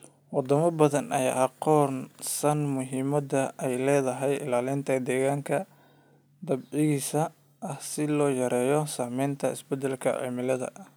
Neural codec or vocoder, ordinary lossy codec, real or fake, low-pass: none; none; real; none